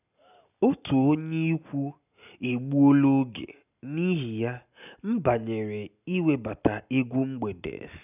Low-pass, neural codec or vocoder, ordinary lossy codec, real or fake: 3.6 kHz; none; none; real